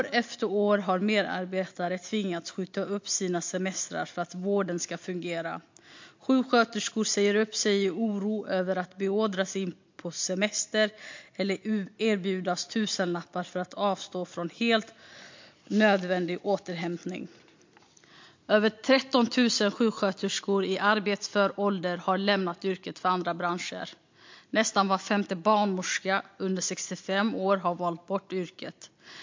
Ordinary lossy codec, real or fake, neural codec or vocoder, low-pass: MP3, 48 kbps; real; none; 7.2 kHz